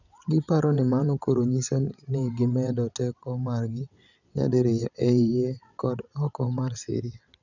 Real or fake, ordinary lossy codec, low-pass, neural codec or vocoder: fake; none; 7.2 kHz; vocoder, 22.05 kHz, 80 mel bands, WaveNeXt